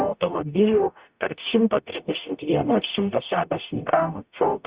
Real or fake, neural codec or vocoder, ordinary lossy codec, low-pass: fake; codec, 44.1 kHz, 0.9 kbps, DAC; Opus, 64 kbps; 3.6 kHz